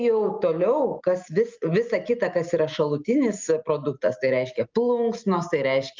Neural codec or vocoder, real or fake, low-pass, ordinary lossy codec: none; real; 7.2 kHz; Opus, 24 kbps